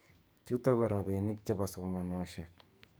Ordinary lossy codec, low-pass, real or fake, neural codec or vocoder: none; none; fake; codec, 44.1 kHz, 2.6 kbps, SNAC